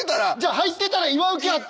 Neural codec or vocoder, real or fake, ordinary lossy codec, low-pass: none; real; none; none